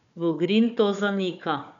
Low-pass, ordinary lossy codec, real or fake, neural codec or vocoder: 7.2 kHz; none; fake; codec, 16 kHz, 4 kbps, FunCodec, trained on Chinese and English, 50 frames a second